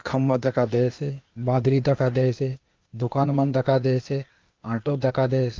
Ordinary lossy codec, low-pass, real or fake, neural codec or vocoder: Opus, 24 kbps; 7.2 kHz; fake; codec, 16 kHz, 0.8 kbps, ZipCodec